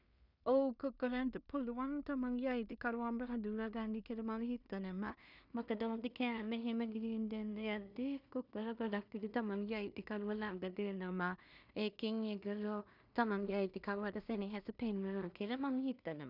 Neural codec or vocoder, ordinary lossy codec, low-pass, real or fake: codec, 16 kHz in and 24 kHz out, 0.4 kbps, LongCat-Audio-Codec, two codebook decoder; none; 5.4 kHz; fake